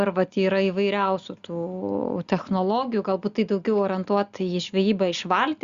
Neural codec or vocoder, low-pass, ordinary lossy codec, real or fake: none; 7.2 kHz; Opus, 64 kbps; real